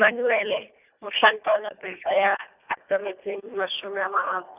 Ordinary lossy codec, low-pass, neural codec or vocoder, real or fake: none; 3.6 kHz; codec, 24 kHz, 1.5 kbps, HILCodec; fake